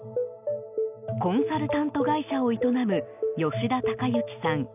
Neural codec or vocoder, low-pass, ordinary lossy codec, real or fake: none; 3.6 kHz; none; real